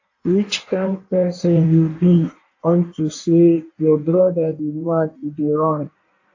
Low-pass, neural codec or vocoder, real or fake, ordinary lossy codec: 7.2 kHz; codec, 16 kHz in and 24 kHz out, 1.1 kbps, FireRedTTS-2 codec; fake; AAC, 48 kbps